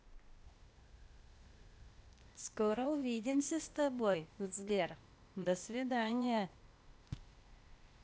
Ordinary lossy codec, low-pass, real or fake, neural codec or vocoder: none; none; fake; codec, 16 kHz, 0.8 kbps, ZipCodec